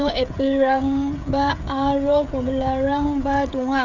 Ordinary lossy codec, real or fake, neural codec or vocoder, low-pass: none; fake; codec, 16 kHz, 16 kbps, FreqCodec, smaller model; 7.2 kHz